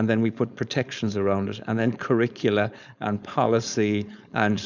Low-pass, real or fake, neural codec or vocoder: 7.2 kHz; fake; codec, 16 kHz, 4.8 kbps, FACodec